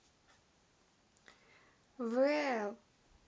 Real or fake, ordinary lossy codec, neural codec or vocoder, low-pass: real; none; none; none